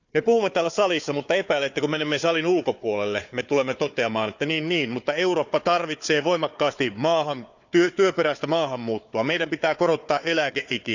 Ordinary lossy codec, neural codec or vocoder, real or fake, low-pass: none; codec, 16 kHz, 4 kbps, FunCodec, trained on Chinese and English, 50 frames a second; fake; 7.2 kHz